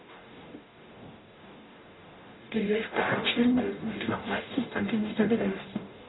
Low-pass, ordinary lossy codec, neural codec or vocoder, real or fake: 7.2 kHz; AAC, 16 kbps; codec, 44.1 kHz, 0.9 kbps, DAC; fake